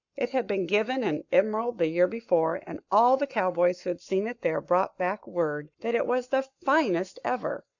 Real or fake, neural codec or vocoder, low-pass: fake; codec, 44.1 kHz, 7.8 kbps, Pupu-Codec; 7.2 kHz